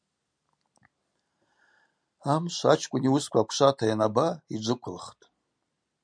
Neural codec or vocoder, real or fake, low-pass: none; real; 9.9 kHz